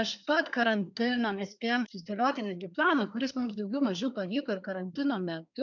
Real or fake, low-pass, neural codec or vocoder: fake; 7.2 kHz; codec, 24 kHz, 1 kbps, SNAC